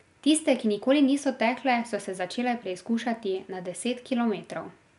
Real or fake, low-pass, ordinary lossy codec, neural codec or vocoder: real; 10.8 kHz; none; none